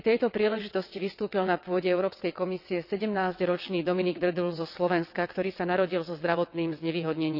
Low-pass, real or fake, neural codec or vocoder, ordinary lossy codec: 5.4 kHz; fake; vocoder, 22.05 kHz, 80 mel bands, WaveNeXt; none